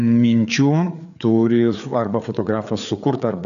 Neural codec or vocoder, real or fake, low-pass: codec, 16 kHz, 4 kbps, FreqCodec, larger model; fake; 7.2 kHz